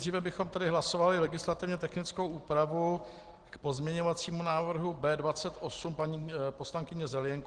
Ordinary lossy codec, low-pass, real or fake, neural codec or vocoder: Opus, 16 kbps; 9.9 kHz; real; none